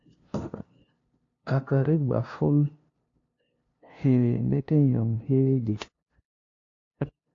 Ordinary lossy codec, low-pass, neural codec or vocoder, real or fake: AAC, 48 kbps; 7.2 kHz; codec, 16 kHz, 0.5 kbps, FunCodec, trained on LibriTTS, 25 frames a second; fake